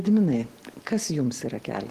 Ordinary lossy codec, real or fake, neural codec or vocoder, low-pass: Opus, 16 kbps; real; none; 14.4 kHz